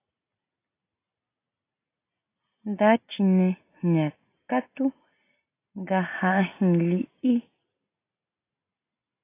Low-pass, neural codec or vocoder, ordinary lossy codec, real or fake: 3.6 kHz; none; AAC, 24 kbps; real